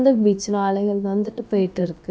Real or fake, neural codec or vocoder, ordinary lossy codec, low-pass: fake; codec, 16 kHz, about 1 kbps, DyCAST, with the encoder's durations; none; none